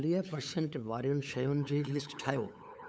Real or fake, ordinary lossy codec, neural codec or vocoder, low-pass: fake; none; codec, 16 kHz, 8 kbps, FunCodec, trained on LibriTTS, 25 frames a second; none